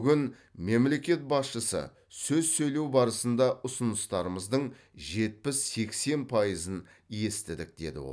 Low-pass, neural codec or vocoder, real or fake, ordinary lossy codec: none; none; real; none